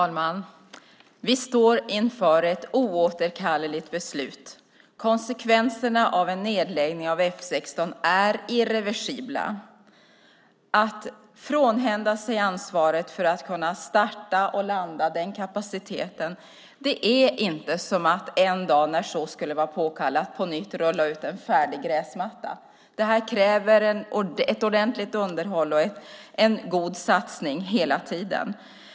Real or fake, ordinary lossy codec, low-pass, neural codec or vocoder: real; none; none; none